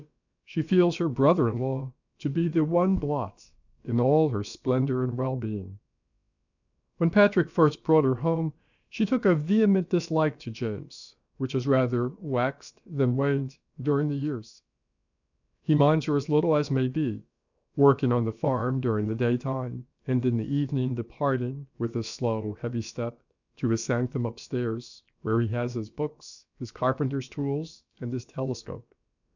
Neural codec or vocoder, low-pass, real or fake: codec, 16 kHz, about 1 kbps, DyCAST, with the encoder's durations; 7.2 kHz; fake